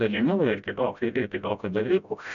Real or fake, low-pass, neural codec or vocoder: fake; 7.2 kHz; codec, 16 kHz, 0.5 kbps, FreqCodec, smaller model